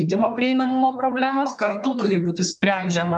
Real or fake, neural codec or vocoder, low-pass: fake; codec, 24 kHz, 1 kbps, SNAC; 10.8 kHz